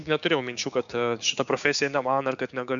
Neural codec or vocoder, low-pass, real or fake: codec, 16 kHz, 6 kbps, DAC; 7.2 kHz; fake